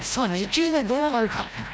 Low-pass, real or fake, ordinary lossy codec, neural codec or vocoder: none; fake; none; codec, 16 kHz, 0.5 kbps, FreqCodec, larger model